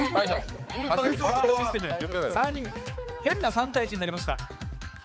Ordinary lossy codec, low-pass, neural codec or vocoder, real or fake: none; none; codec, 16 kHz, 4 kbps, X-Codec, HuBERT features, trained on balanced general audio; fake